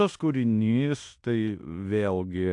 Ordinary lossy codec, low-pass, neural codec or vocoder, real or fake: AAC, 64 kbps; 10.8 kHz; codec, 16 kHz in and 24 kHz out, 0.9 kbps, LongCat-Audio-Codec, fine tuned four codebook decoder; fake